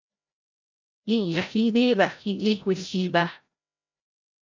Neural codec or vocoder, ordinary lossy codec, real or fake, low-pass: codec, 16 kHz, 0.5 kbps, FreqCodec, larger model; MP3, 64 kbps; fake; 7.2 kHz